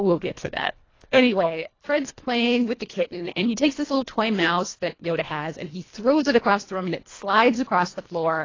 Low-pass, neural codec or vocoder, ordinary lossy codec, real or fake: 7.2 kHz; codec, 24 kHz, 1.5 kbps, HILCodec; AAC, 32 kbps; fake